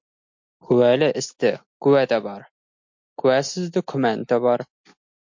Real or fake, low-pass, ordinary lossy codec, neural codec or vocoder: real; 7.2 kHz; MP3, 48 kbps; none